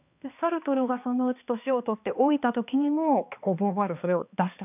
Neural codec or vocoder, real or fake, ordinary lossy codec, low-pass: codec, 16 kHz, 2 kbps, X-Codec, HuBERT features, trained on balanced general audio; fake; none; 3.6 kHz